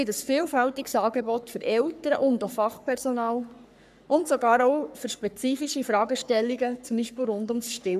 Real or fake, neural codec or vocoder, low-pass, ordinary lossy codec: fake; codec, 44.1 kHz, 3.4 kbps, Pupu-Codec; 14.4 kHz; none